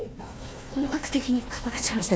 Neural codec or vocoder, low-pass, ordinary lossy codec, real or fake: codec, 16 kHz, 1 kbps, FunCodec, trained on Chinese and English, 50 frames a second; none; none; fake